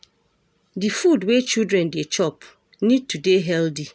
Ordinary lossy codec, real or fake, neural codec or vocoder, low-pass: none; real; none; none